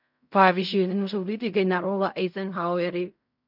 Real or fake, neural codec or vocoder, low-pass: fake; codec, 16 kHz in and 24 kHz out, 0.4 kbps, LongCat-Audio-Codec, fine tuned four codebook decoder; 5.4 kHz